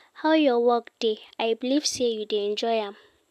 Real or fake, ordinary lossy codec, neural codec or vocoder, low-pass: real; AAC, 96 kbps; none; 14.4 kHz